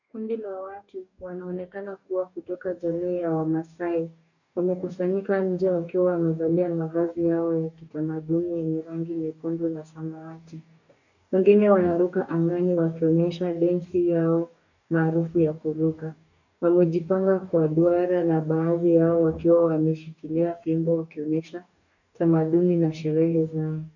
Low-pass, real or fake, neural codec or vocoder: 7.2 kHz; fake; codec, 44.1 kHz, 2.6 kbps, DAC